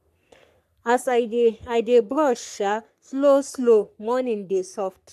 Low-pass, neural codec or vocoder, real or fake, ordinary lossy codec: 14.4 kHz; codec, 44.1 kHz, 3.4 kbps, Pupu-Codec; fake; none